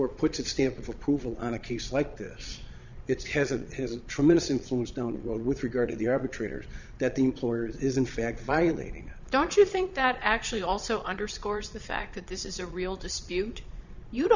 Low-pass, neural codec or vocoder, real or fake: 7.2 kHz; vocoder, 22.05 kHz, 80 mel bands, Vocos; fake